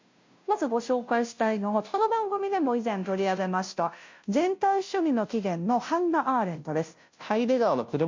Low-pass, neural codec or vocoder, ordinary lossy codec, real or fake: 7.2 kHz; codec, 16 kHz, 0.5 kbps, FunCodec, trained on Chinese and English, 25 frames a second; MP3, 48 kbps; fake